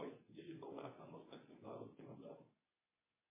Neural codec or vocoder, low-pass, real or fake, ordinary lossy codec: codec, 24 kHz, 0.9 kbps, WavTokenizer, medium speech release version 2; 3.6 kHz; fake; MP3, 16 kbps